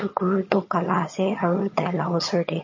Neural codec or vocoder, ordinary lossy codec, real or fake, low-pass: vocoder, 22.05 kHz, 80 mel bands, HiFi-GAN; MP3, 32 kbps; fake; 7.2 kHz